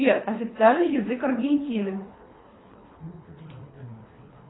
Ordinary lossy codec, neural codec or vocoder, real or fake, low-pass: AAC, 16 kbps; codec, 24 kHz, 3 kbps, HILCodec; fake; 7.2 kHz